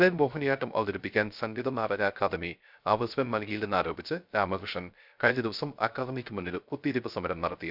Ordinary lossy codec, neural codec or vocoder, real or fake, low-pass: none; codec, 16 kHz, 0.3 kbps, FocalCodec; fake; 5.4 kHz